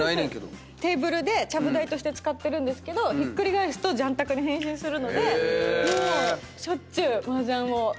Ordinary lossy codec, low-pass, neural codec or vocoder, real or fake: none; none; none; real